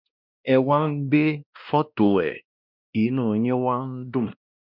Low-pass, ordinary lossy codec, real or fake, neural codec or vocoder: 5.4 kHz; none; fake; codec, 16 kHz, 1 kbps, X-Codec, WavLM features, trained on Multilingual LibriSpeech